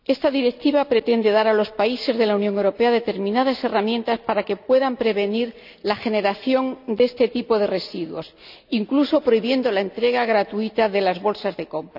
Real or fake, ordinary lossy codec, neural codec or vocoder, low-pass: real; none; none; 5.4 kHz